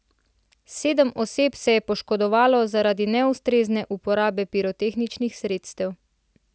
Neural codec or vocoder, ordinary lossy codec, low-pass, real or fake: none; none; none; real